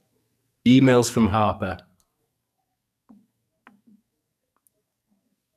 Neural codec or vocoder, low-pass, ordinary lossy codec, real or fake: codec, 44.1 kHz, 2.6 kbps, SNAC; 14.4 kHz; none; fake